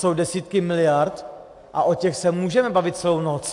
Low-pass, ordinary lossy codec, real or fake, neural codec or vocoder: 10.8 kHz; AAC, 64 kbps; real; none